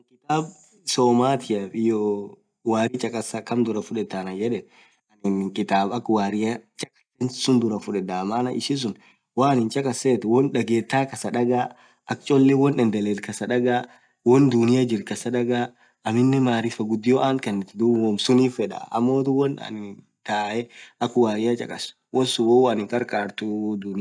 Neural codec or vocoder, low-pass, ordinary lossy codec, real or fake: none; 9.9 kHz; none; real